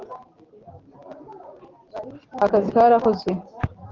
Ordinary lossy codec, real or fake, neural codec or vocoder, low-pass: Opus, 16 kbps; real; none; 7.2 kHz